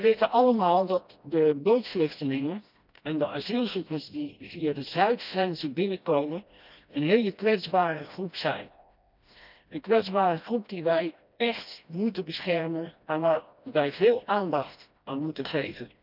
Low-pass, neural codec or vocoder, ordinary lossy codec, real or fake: 5.4 kHz; codec, 16 kHz, 1 kbps, FreqCodec, smaller model; none; fake